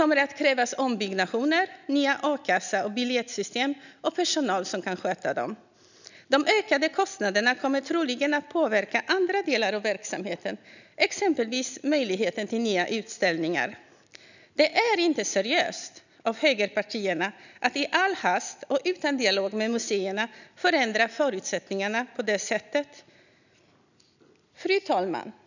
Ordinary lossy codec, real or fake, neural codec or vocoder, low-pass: none; real; none; 7.2 kHz